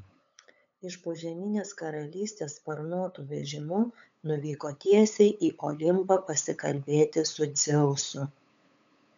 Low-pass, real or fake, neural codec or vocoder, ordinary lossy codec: 7.2 kHz; fake; codec, 16 kHz, 8 kbps, FunCodec, trained on LibriTTS, 25 frames a second; MP3, 64 kbps